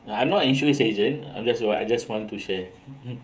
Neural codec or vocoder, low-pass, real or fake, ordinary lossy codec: codec, 16 kHz, 16 kbps, FreqCodec, smaller model; none; fake; none